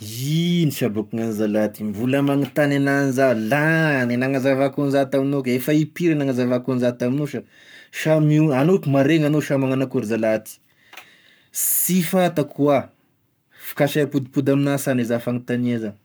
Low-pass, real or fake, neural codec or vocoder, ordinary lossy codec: none; fake; codec, 44.1 kHz, 7.8 kbps, Pupu-Codec; none